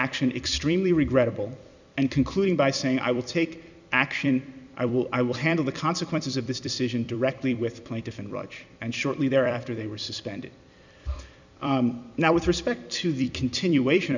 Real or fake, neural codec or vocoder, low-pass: fake; vocoder, 44.1 kHz, 128 mel bands every 256 samples, BigVGAN v2; 7.2 kHz